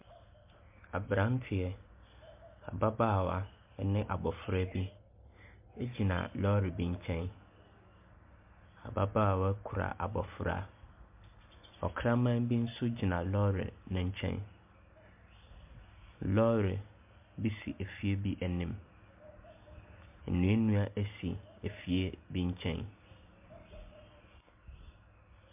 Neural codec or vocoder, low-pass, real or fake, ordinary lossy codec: none; 3.6 kHz; real; MP3, 32 kbps